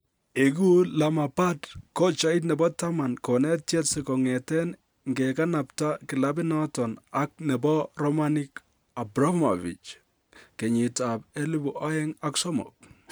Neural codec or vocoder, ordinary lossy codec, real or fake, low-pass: none; none; real; none